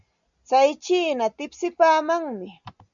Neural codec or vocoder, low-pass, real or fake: none; 7.2 kHz; real